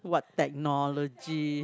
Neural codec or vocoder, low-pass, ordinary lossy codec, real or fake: none; none; none; real